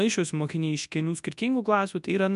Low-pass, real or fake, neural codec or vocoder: 10.8 kHz; fake; codec, 24 kHz, 0.9 kbps, WavTokenizer, large speech release